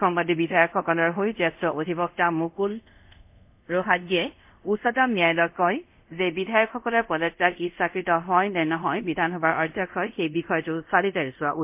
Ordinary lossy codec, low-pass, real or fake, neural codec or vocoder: MP3, 32 kbps; 3.6 kHz; fake; codec, 24 kHz, 0.5 kbps, DualCodec